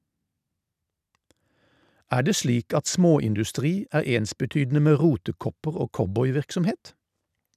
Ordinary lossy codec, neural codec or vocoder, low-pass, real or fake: none; none; 14.4 kHz; real